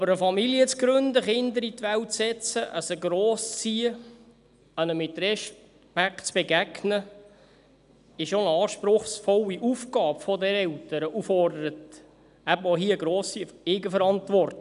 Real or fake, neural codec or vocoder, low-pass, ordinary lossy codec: real; none; 10.8 kHz; none